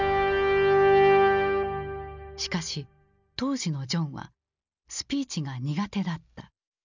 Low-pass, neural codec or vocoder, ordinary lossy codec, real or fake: 7.2 kHz; none; none; real